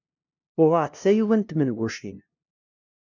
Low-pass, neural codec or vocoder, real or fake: 7.2 kHz; codec, 16 kHz, 0.5 kbps, FunCodec, trained on LibriTTS, 25 frames a second; fake